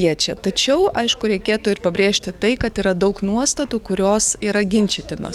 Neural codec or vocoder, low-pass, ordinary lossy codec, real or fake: codec, 44.1 kHz, 7.8 kbps, DAC; 19.8 kHz; Opus, 64 kbps; fake